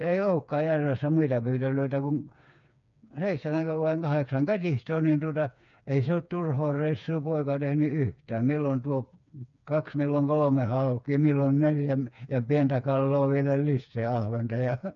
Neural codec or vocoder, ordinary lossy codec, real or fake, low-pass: codec, 16 kHz, 4 kbps, FreqCodec, smaller model; none; fake; 7.2 kHz